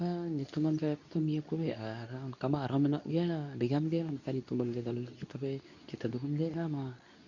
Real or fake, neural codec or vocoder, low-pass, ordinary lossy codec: fake; codec, 24 kHz, 0.9 kbps, WavTokenizer, medium speech release version 2; 7.2 kHz; none